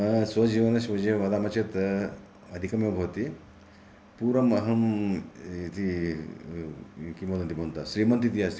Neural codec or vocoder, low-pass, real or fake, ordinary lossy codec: none; none; real; none